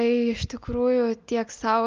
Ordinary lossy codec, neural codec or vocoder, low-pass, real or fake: Opus, 24 kbps; none; 7.2 kHz; real